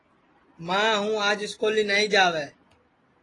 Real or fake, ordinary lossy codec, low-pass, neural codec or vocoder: real; AAC, 32 kbps; 10.8 kHz; none